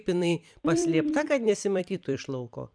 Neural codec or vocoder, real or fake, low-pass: vocoder, 44.1 kHz, 128 mel bands every 256 samples, BigVGAN v2; fake; 9.9 kHz